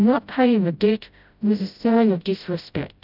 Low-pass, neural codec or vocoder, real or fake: 5.4 kHz; codec, 16 kHz, 0.5 kbps, FreqCodec, smaller model; fake